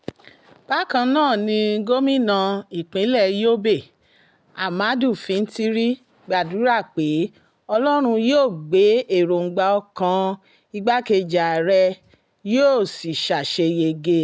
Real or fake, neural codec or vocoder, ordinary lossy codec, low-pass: real; none; none; none